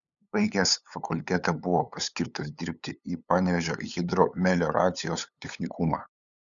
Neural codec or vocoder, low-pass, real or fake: codec, 16 kHz, 8 kbps, FunCodec, trained on LibriTTS, 25 frames a second; 7.2 kHz; fake